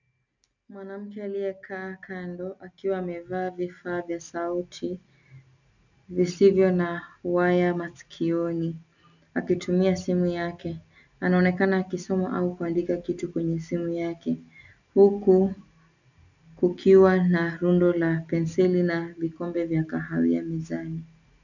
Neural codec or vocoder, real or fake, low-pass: none; real; 7.2 kHz